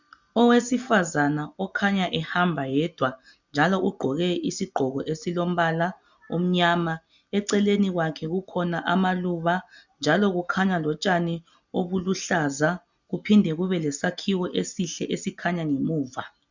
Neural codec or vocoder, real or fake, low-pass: none; real; 7.2 kHz